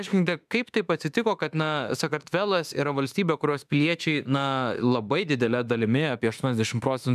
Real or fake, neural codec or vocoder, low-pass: fake; autoencoder, 48 kHz, 32 numbers a frame, DAC-VAE, trained on Japanese speech; 14.4 kHz